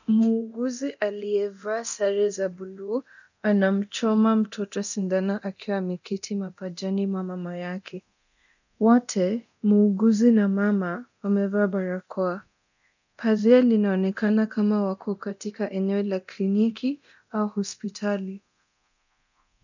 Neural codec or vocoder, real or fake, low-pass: codec, 24 kHz, 0.9 kbps, DualCodec; fake; 7.2 kHz